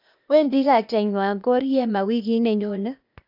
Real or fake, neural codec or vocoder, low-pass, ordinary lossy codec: fake; codec, 16 kHz, 0.8 kbps, ZipCodec; 5.4 kHz; none